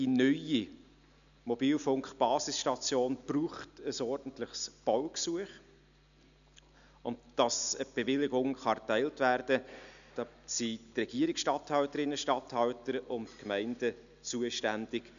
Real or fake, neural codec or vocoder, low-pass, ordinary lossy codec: real; none; 7.2 kHz; none